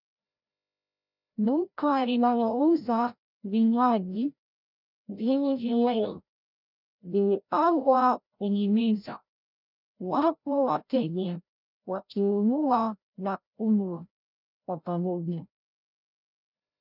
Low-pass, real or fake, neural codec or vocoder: 5.4 kHz; fake; codec, 16 kHz, 0.5 kbps, FreqCodec, larger model